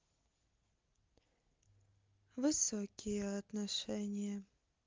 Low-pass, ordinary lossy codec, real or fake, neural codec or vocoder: 7.2 kHz; Opus, 32 kbps; real; none